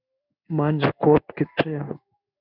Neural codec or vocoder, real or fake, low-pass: codec, 16 kHz in and 24 kHz out, 1 kbps, XY-Tokenizer; fake; 5.4 kHz